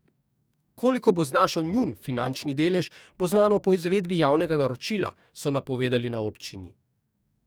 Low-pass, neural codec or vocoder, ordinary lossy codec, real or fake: none; codec, 44.1 kHz, 2.6 kbps, DAC; none; fake